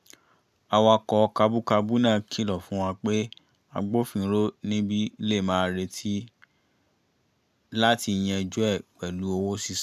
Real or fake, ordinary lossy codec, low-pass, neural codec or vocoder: real; none; 14.4 kHz; none